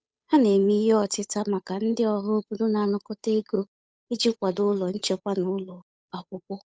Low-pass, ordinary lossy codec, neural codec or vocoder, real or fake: none; none; codec, 16 kHz, 8 kbps, FunCodec, trained on Chinese and English, 25 frames a second; fake